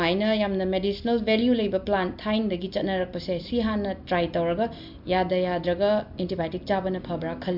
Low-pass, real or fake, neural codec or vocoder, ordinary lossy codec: 5.4 kHz; real; none; MP3, 48 kbps